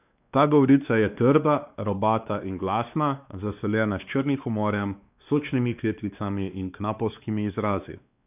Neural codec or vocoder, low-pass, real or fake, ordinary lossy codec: codec, 16 kHz, 2 kbps, X-Codec, WavLM features, trained on Multilingual LibriSpeech; 3.6 kHz; fake; none